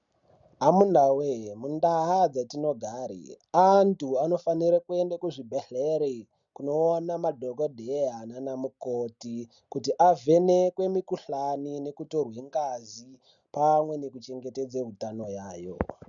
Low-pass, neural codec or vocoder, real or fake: 7.2 kHz; none; real